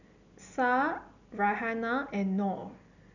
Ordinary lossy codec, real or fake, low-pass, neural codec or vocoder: none; real; 7.2 kHz; none